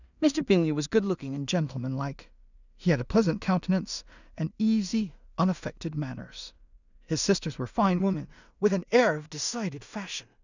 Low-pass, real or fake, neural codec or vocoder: 7.2 kHz; fake; codec, 16 kHz in and 24 kHz out, 0.4 kbps, LongCat-Audio-Codec, two codebook decoder